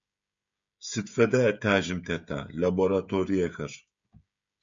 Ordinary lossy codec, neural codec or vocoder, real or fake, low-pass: MP3, 64 kbps; codec, 16 kHz, 16 kbps, FreqCodec, smaller model; fake; 7.2 kHz